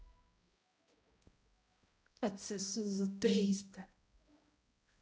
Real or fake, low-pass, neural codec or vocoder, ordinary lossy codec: fake; none; codec, 16 kHz, 0.5 kbps, X-Codec, HuBERT features, trained on balanced general audio; none